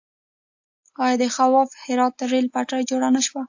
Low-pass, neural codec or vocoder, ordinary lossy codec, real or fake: 7.2 kHz; none; AAC, 48 kbps; real